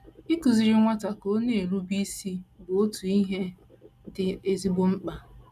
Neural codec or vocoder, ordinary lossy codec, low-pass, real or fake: none; none; 14.4 kHz; real